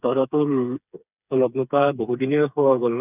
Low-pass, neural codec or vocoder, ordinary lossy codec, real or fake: 3.6 kHz; codec, 16 kHz, 4 kbps, FreqCodec, smaller model; none; fake